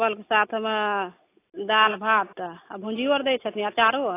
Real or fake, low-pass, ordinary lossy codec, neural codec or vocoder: real; 3.6 kHz; AAC, 24 kbps; none